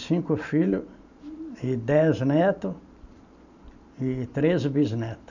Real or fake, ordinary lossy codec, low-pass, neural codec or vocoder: fake; none; 7.2 kHz; vocoder, 44.1 kHz, 128 mel bands every 512 samples, BigVGAN v2